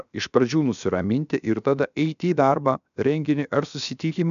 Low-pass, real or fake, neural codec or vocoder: 7.2 kHz; fake; codec, 16 kHz, 0.7 kbps, FocalCodec